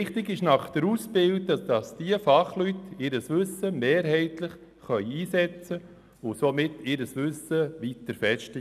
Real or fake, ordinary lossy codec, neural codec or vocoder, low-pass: real; none; none; 14.4 kHz